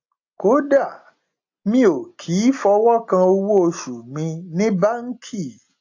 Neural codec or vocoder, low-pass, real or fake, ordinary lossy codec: none; 7.2 kHz; real; AAC, 48 kbps